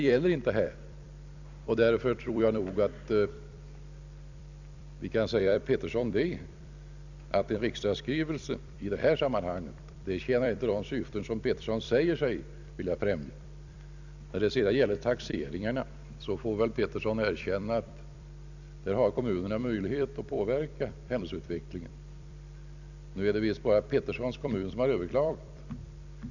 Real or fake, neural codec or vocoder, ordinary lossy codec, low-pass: fake; vocoder, 44.1 kHz, 128 mel bands every 256 samples, BigVGAN v2; none; 7.2 kHz